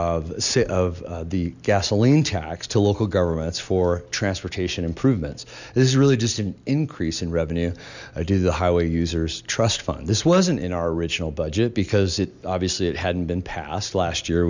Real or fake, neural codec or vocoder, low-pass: real; none; 7.2 kHz